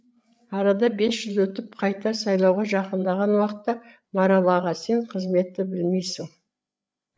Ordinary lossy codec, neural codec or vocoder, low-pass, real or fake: none; codec, 16 kHz, 8 kbps, FreqCodec, larger model; none; fake